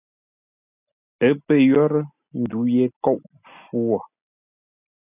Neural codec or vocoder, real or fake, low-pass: none; real; 3.6 kHz